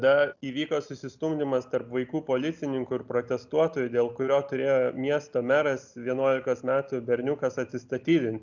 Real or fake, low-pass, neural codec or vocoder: real; 7.2 kHz; none